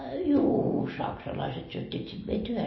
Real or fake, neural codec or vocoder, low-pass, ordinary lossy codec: real; none; 7.2 kHz; MP3, 24 kbps